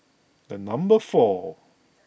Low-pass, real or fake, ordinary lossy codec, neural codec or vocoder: none; real; none; none